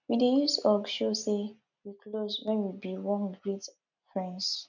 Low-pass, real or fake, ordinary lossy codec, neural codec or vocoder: 7.2 kHz; real; none; none